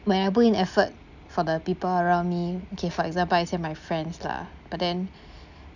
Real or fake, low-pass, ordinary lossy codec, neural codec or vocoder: real; 7.2 kHz; none; none